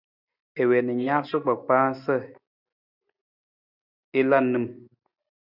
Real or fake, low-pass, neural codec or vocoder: real; 5.4 kHz; none